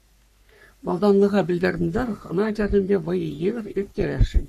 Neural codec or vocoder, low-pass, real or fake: codec, 44.1 kHz, 3.4 kbps, Pupu-Codec; 14.4 kHz; fake